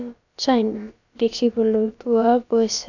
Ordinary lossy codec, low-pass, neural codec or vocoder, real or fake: none; 7.2 kHz; codec, 16 kHz, about 1 kbps, DyCAST, with the encoder's durations; fake